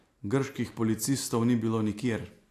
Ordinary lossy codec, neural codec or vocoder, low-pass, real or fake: AAC, 64 kbps; none; 14.4 kHz; real